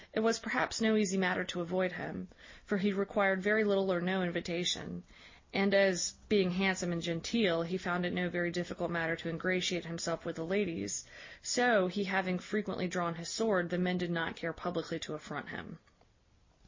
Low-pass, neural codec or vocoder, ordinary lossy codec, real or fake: 7.2 kHz; none; MP3, 32 kbps; real